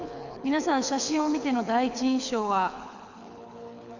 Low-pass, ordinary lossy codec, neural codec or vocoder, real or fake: 7.2 kHz; none; codec, 24 kHz, 6 kbps, HILCodec; fake